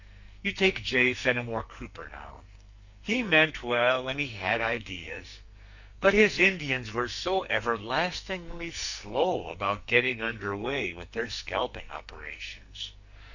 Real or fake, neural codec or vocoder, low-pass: fake; codec, 44.1 kHz, 2.6 kbps, SNAC; 7.2 kHz